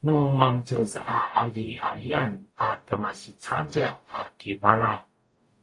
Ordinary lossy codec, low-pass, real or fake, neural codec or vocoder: AAC, 48 kbps; 10.8 kHz; fake; codec, 44.1 kHz, 0.9 kbps, DAC